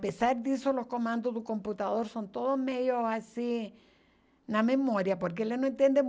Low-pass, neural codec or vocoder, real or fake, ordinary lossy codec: none; none; real; none